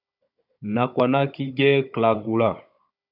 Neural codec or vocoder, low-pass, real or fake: codec, 16 kHz, 16 kbps, FunCodec, trained on Chinese and English, 50 frames a second; 5.4 kHz; fake